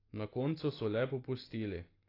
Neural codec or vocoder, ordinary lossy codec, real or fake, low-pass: none; AAC, 24 kbps; real; 5.4 kHz